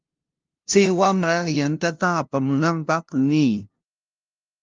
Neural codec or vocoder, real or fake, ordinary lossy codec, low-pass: codec, 16 kHz, 0.5 kbps, FunCodec, trained on LibriTTS, 25 frames a second; fake; Opus, 32 kbps; 7.2 kHz